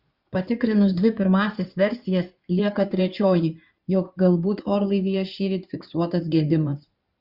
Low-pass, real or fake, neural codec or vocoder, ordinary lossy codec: 5.4 kHz; fake; codec, 16 kHz in and 24 kHz out, 2.2 kbps, FireRedTTS-2 codec; Opus, 64 kbps